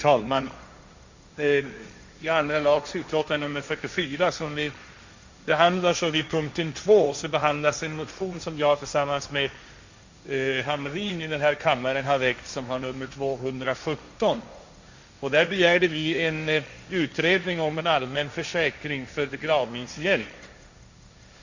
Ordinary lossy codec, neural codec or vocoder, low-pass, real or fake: Opus, 64 kbps; codec, 16 kHz, 1.1 kbps, Voila-Tokenizer; 7.2 kHz; fake